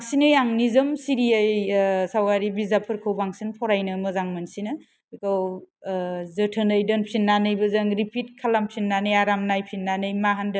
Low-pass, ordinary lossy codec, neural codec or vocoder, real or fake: none; none; none; real